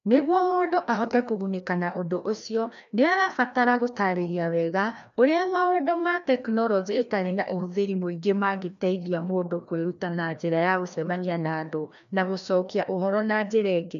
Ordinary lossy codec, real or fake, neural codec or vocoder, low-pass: none; fake; codec, 16 kHz, 1 kbps, FreqCodec, larger model; 7.2 kHz